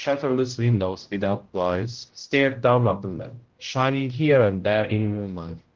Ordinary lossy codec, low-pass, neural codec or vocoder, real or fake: Opus, 16 kbps; 7.2 kHz; codec, 16 kHz, 0.5 kbps, X-Codec, HuBERT features, trained on general audio; fake